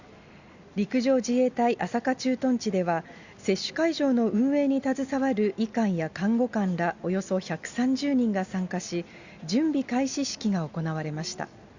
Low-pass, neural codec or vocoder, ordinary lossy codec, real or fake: 7.2 kHz; none; Opus, 64 kbps; real